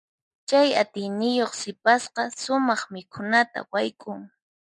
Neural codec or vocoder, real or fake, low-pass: none; real; 10.8 kHz